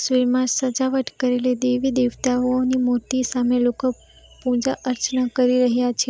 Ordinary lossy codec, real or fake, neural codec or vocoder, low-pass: none; real; none; none